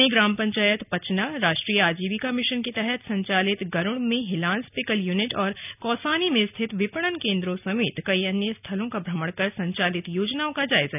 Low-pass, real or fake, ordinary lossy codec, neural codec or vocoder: 3.6 kHz; real; none; none